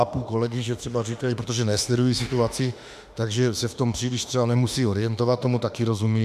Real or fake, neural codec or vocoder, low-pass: fake; autoencoder, 48 kHz, 32 numbers a frame, DAC-VAE, trained on Japanese speech; 14.4 kHz